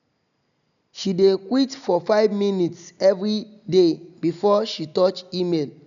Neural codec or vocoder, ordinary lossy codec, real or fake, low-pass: none; none; real; 7.2 kHz